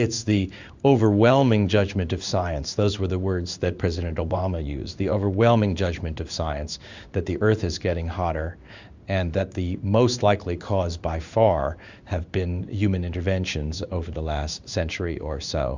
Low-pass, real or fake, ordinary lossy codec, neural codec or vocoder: 7.2 kHz; fake; Opus, 64 kbps; codec, 16 kHz in and 24 kHz out, 1 kbps, XY-Tokenizer